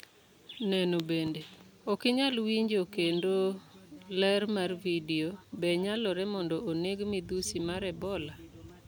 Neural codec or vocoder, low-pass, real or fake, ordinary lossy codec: none; none; real; none